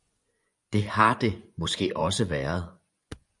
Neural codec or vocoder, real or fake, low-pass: vocoder, 24 kHz, 100 mel bands, Vocos; fake; 10.8 kHz